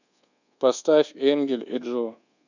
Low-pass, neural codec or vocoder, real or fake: 7.2 kHz; codec, 24 kHz, 1.2 kbps, DualCodec; fake